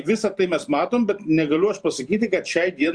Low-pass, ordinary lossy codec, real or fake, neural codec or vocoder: 9.9 kHz; Opus, 64 kbps; fake; vocoder, 44.1 kHz, 128 mel bands every 512 samples, BigVGAN v2